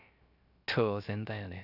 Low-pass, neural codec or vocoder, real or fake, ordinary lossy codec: 5.4 kHz; codec, 16 kHz, 0.3 kbps, FocalCodec; fake; none